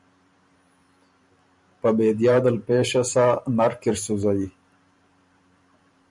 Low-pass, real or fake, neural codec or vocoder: 10.8 kHz; fake; vocoder, 44.1 kHz, 128 mel bands every 512 samples, BigVGAN v2